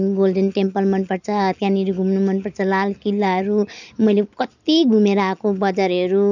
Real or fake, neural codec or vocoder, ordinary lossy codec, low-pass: real; none; none; 7.2 kHz